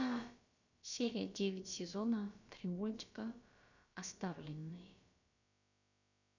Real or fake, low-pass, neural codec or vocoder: fake; 7.2 kHz; codec, 16 kHz, about 1 kbps, DyCAST, with the encoder's durations